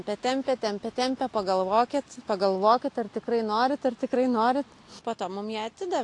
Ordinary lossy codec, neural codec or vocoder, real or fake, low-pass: AAC, 48 kbps; none; real; 10.8 kHz